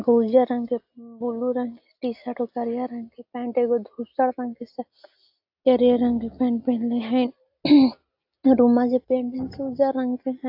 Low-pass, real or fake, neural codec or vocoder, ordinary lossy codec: 5.4 kHz; real; none; AAC, 48 kbps